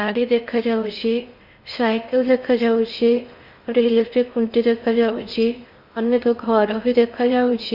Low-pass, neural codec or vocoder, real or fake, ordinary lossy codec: 5.4 kHz; codec, 16 kHz in and 24 kHz out, 0.8 kbps, FocalCodec, streaming, 65536 codes; fake; Opus, 64 kbps